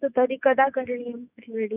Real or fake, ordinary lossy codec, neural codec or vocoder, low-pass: fake; none; autoencoder, 48 kHz, 128 numbers a frame, DAC-VAE, trained on Japanese speech; 3.6 kHz